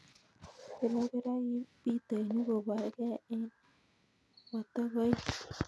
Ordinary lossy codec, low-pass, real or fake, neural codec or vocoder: none; none; real; none